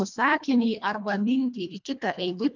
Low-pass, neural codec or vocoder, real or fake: 7.2 kHz; codec, 24 kHz, 1.5 kbps, HILCodec; fake